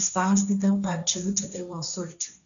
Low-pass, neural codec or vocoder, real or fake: 7.2 kHz; codec, 16 kHz, 1.1 kbps, Voila-Tokenizer; fake